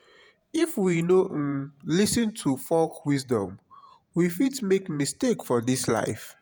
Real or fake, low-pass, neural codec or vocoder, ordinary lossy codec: fake; none; vocoder, 48 kHz, 128 mel bands, Vocos; none